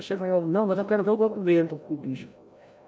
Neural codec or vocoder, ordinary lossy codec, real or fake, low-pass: codec, 16 kHz, 0.5 kbps, FreqCodec, larger model; none; fake; none